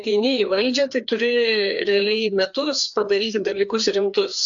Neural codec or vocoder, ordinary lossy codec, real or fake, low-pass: codec, 16 kHz, 2 kbps, FreqCodec, larger model; AAC, 64 kbps; fake; 7.2 kHz